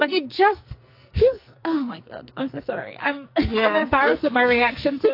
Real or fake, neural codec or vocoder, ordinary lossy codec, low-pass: fake; codec, 44.1 kHz, 2.6 kbps, SNAC; AAC, 32 kbps; 5.4 kHz